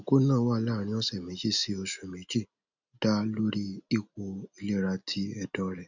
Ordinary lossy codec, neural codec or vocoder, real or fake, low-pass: none; none; real; 7.2 kHz